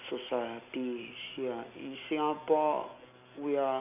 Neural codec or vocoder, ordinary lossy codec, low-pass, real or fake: none; none; 3.6 kHz; real